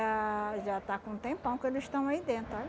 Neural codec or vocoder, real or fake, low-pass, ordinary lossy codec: none; real; none; none